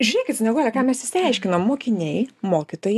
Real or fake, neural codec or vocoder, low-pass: real; none; 14.4 kHz